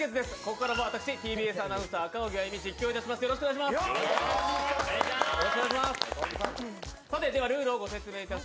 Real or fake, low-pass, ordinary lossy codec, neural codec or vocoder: real; none; none; none